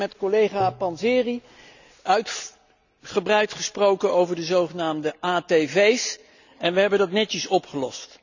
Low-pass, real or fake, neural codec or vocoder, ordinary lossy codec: 7.2 kHz; real; none; none